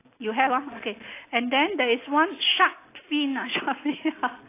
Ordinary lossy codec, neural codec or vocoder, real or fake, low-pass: AAC, 24 kbps; none; real; 3.6 kHz